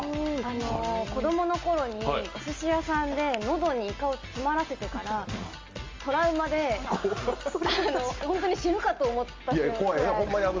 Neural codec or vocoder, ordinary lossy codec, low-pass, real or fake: none; Opus, 32 kbps; 7.2 kHz; real